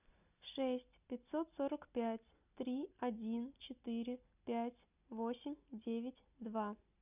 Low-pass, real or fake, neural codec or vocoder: 3.6 kHz; real; none